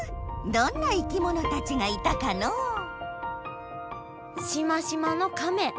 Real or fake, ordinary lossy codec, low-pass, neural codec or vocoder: real; none; none; none